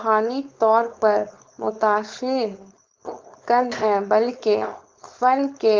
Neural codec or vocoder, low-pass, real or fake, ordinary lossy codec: codec, 16 kHz, 4.8 kbps, FACodec; 7.2 kHz; fake; Opus, 24 kbps